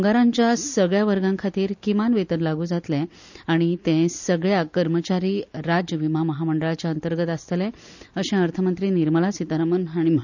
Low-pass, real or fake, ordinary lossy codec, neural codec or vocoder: 7.2 kHz; real; none; none